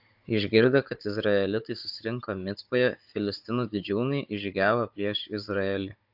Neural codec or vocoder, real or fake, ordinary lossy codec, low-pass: codec, 16 kHz, 16 kbps, FunCodec, trained on Chinese and English, 50 frames a second; fake; AAC, 48 kbps; 5.4 kHz